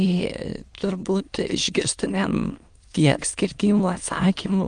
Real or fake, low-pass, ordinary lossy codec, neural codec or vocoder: fake; 9.9 kHz; Opus, 32 kbps; autoencoder, 22.05 kHz, a latent of 192 numbers a frame, VITS, trained on many speakers